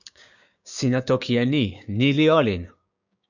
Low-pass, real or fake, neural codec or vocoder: 7.2 kHz; fake; codec, 16 kHz, 6 kbps, DAC